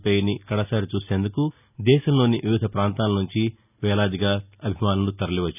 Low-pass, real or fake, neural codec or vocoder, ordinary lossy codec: 3.6 kHz; real; none; none